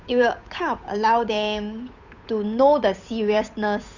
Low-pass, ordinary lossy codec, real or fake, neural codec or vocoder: 7.2 kHz; none; fake; codec, 16 kHz, 8 kbps, FunCodec, trained on Chinese and English, 25 frames a second